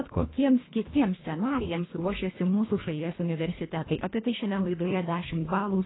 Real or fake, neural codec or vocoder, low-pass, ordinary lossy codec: fake; codec, 24 kHz, 1.5 kbps, HILCodec; 7.2 kHz; AAC, 16 kbps